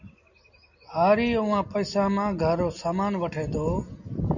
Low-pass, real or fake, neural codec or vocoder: 7.2 kHz; real; none